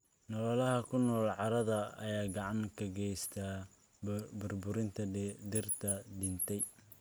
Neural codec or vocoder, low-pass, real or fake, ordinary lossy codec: none; none; real; none